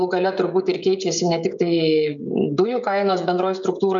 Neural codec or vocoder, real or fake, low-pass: codec, 16 kHz, 16 kbps, FreqCodec, smaller model; fake; 7.2 kHz